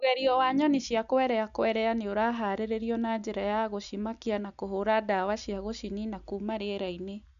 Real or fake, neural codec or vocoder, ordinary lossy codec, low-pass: real; none; none; 7.2 kHz